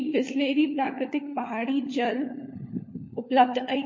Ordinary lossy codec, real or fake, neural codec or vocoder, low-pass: MP3, 32 kbps; fake; codec, 16 kHz, 4 kbps, FunCodec, trained on LibriTTS, 50 frames a second; 7.2 kHz